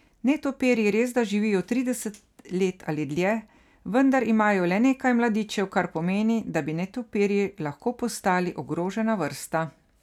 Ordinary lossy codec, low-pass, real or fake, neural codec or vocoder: none; 19.8 kHz; real; none